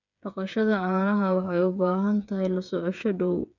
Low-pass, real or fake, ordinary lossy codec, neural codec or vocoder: 7.2 kHz; fake; none; codec, 16 kHz, 8 kbps, FreqCodec, smaller model